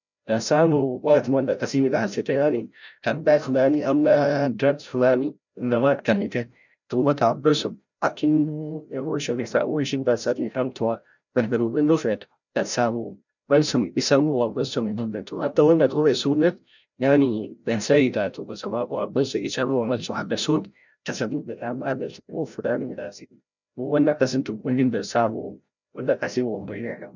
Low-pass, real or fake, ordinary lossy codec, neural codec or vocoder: 7.2 kHz; fake; none; codec, 16 kHz, 0.5 kbps, FreqCodec, larger model